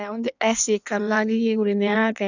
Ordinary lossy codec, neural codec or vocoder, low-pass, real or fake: none; codec, 16 kHz in and 24 kHz out, 1.1 kbps, FireRedTTS-2 codec; 7.2 kHz; fake